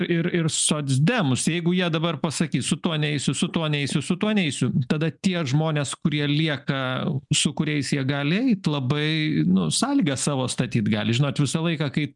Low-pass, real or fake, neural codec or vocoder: 10.8 kHz; real; none